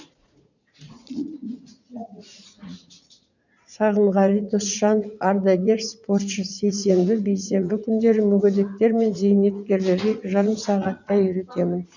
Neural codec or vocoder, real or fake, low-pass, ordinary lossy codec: vocoder, 44.1 kHz, 80 mel bands, Vocos; fake; 7.2 kHz; none